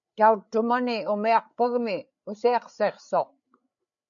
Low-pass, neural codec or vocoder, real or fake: 7.2 kHz; codec, 16 kHz, 8 kbps, FreqCodec, larger model; fake